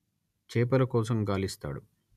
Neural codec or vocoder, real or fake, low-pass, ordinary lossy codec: none; real; 14.4 kHz; none